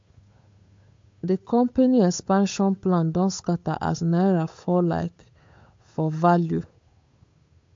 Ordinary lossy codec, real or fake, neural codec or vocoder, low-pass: MP3, 48 kbps; fake; codec, 16 kHz, 8 kbps, FunCodec, trained on Chinese and English, 25 frames a second; 7.2 kHz